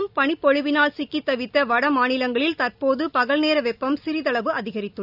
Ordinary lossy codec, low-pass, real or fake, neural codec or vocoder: none; 5.4 kHz; real; none